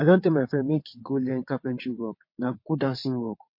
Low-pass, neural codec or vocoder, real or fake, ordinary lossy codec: 5.4 kHz; vocoder, 22.05 kHz, 80 mel bands, WaveNeXt; fake; MP3, 32 kbps